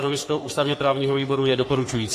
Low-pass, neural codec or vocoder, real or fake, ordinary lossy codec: 14.4 kHz; codec, 44.1 kHz, 3.4 kbps, Pupu-Codec; fake; AAC, 48 kbps